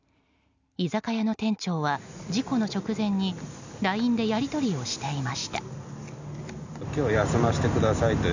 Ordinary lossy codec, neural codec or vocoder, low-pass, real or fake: none; none; 7.2 kHz; real